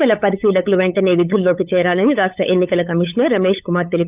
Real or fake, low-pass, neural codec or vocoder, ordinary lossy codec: fake; 3.6 kHz; codec, 16 kHz, 8 kbps, FunCodec, trained on LibriTTS, 25 frames a second; Opus, 24 kbps